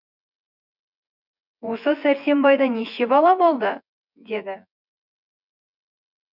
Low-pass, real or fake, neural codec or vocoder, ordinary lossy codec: 5.4 kHz; fake; vocoder, 24 kHz, 100 mel bands, Vocos; none